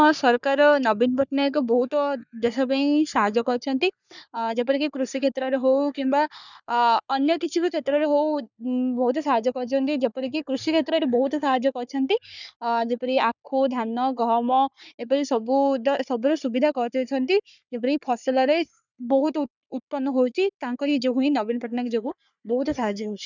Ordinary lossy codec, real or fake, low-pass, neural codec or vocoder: none; fake; 7.2 kHz; codec, 44.1 kHz, 3.4 kbps, Pupu-Codec